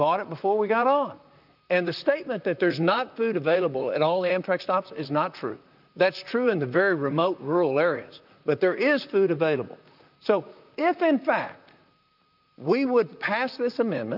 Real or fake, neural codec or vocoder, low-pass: fake; vocoder, 44.1 kHz, 128 mel bands, Pupu-Vocoder; 5.4 kHz